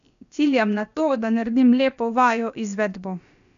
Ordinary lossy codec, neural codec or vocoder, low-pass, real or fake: none; codec, 16 kHz, about 1 kbps, DyCAST, with the encoder's durations; 7.2 kHz; fake